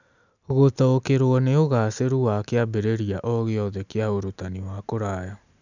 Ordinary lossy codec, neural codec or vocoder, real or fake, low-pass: none; none; real; 7.2 kHz